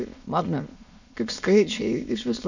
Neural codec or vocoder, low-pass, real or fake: autoencoder, 22.05 kHz, a latent of 192 numbers a frame, VITS, trained on many speakers; 7.2 kHz; fake